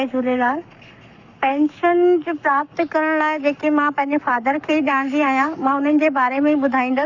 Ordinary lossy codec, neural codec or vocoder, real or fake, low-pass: none; codec, 44.1 kHz, 7.8 kbps, Pupu-Codec; fake; 7.2 kHz